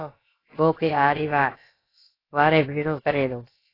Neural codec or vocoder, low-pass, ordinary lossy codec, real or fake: codec, 16 kHz, about 1 kbps, DyCAST, with the encoder's durations; 5.4 kHz; AAC, 24 kbps; fake